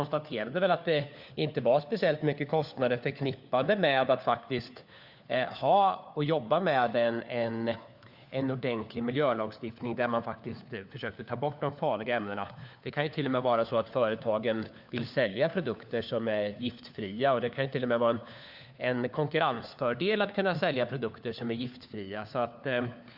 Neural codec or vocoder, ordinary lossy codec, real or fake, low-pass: codec, 16 kHz, 4 kbps, FunCodec, trained on LibriTTS, 50 frames a second; Opus, 64 kbps; fake; 5.4 kHz